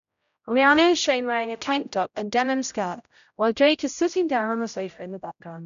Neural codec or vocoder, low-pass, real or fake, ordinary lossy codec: codec, 16 kHz, 0.5 kbps, X-Codec, HuBERT features, trained on general audio; 7.2 kHz; fake; none